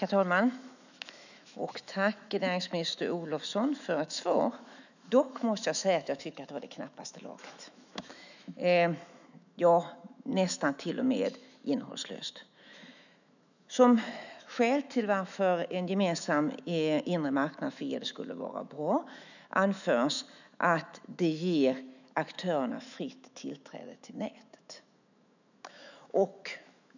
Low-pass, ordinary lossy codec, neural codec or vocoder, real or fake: 7.2 kHz; none; autoencoder, 48 kHz, 128 numbers a frame, DAC-VAE, trained on Japanese speech; fake